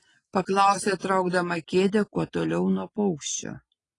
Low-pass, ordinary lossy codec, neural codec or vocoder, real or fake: 10.8 kHz; AAC, 32 kbps; none; real